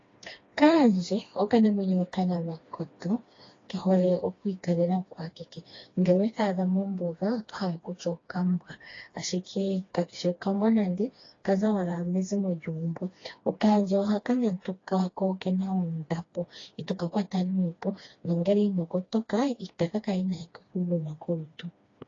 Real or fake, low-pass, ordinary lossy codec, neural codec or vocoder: fake; 7.2 kHz; AAC, 32 kbps; codec, 16 kHz, 2 kbps, FreqCodec, smaller model